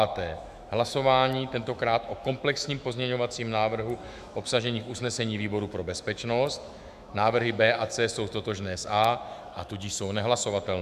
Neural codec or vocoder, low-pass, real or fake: autoencoder, 48 kHz, 128 numbers a frame, DAC-VAE, trained on Japanese speech; 14.4 kHz; fake